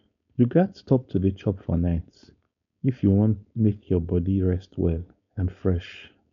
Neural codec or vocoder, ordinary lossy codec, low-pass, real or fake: codec, 16 kHz, 4.8 kbps, FACodec; none; 7.2 kHz; fake